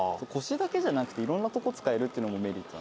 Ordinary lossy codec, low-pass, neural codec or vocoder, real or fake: none; none; none; real